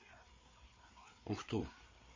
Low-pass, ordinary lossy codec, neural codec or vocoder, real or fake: 7.2 kHz; MP3, 32 kbps; codec, 16 kHz, 4 kbps, FreqCodec, larger model; fake